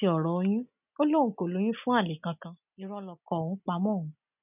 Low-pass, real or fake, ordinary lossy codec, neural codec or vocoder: 3.6 kHz; real; none; none